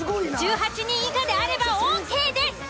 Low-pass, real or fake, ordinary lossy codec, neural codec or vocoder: none; real; none; none